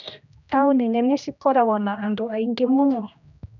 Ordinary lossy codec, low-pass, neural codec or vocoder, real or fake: none; 7.2 kHz; codec, 16 kHz, 1 kbps, X-Codec, HuBERT features, trained on general audio; fake